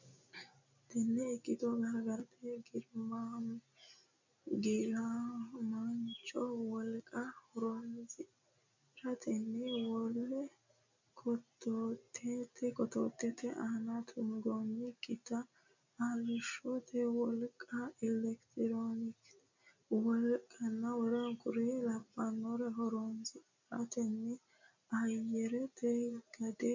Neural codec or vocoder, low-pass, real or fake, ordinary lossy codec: none; 7.2 kHz; real; MP3, 64 kbps